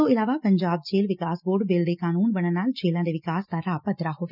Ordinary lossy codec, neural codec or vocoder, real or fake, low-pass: none; none; real; 5.4 kHz